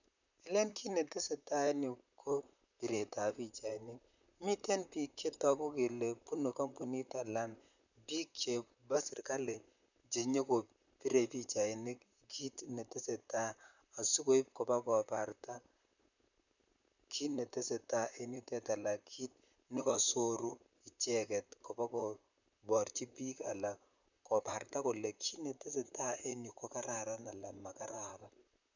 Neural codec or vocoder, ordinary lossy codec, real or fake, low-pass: vocoder, 44.1 kHz, 128 mel bands, Pupu-Vocoder; none; fake; 7.2 kHz